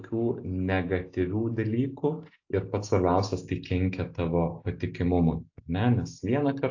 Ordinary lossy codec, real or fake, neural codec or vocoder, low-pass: AAC, 48 kbps; real; none; 7.2 kHz